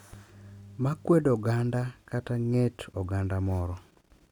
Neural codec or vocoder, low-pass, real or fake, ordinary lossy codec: none; 19.8 kHz; real; none